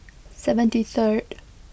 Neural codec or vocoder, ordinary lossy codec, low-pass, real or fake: none; none; none; real